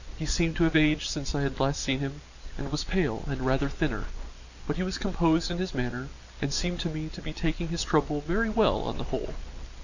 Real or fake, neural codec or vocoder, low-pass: real; none; 7.2 kHz